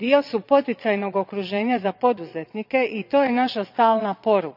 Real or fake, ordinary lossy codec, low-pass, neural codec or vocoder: fake; none; 5.4 kHz; vocoder, 22.05 kHz, 80 mel bands, Vocos